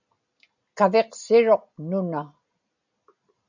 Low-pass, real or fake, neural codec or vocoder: 7.2 kHz; real; none